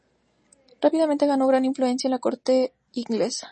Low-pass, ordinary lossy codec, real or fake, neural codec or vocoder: 9.9 kHz; MP3, 32 kbps; real; none